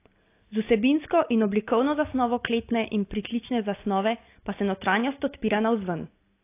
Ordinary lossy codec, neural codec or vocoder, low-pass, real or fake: AAC, 24 kbps; none; 3.6 kHz; real